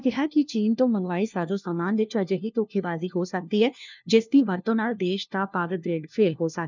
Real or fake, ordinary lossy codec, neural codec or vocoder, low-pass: fake; none; codec, 16 kHz, 1 kbps, FunCodec, trained on LibriTTS, 50 frames a second; 7.2 kHz